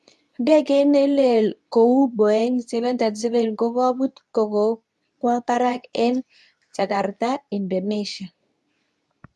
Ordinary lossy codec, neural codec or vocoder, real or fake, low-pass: none; codec, 24 kHz, 0.9 kbps, WavTokenizer, medium speech release version 2; fake; none